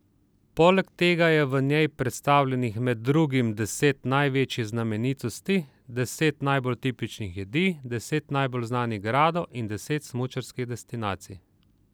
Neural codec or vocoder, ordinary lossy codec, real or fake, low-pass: none; none; real; none